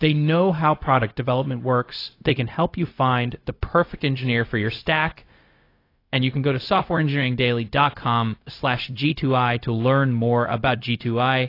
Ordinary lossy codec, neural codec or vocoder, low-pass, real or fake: AAC, 32 kbps; codec, 16 kHz, 0.4 kbps, LongCat-Audio-Codec; 5.4 kHz; fake